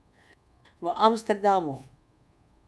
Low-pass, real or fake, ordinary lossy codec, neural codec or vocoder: none; fake; none; codec, 24 kHz, 1.2 kbps, DualCodec